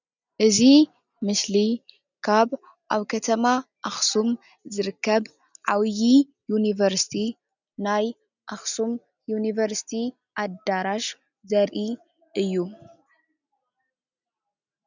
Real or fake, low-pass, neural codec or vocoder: real; 7.2 kHz; none